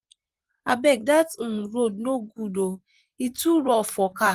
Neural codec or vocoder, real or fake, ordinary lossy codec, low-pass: vocoder, 44.1 kHz, 128 mel bands, Pupu-Vocoder; fake; Opus, 24 kbps; 14.4 kHz